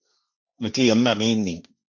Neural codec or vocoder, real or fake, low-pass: codec, 16 kHz, 1.1 kbps, Voila-Tokenizer; fake; 7.2 kHz